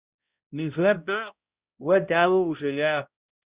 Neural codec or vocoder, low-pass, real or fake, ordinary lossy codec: codec, 16 kHz, 0.5 kbps, X-Codec, HuBERT features, trained on balanced general audio; 3.6 kHz; fake; Opus, 64 kbps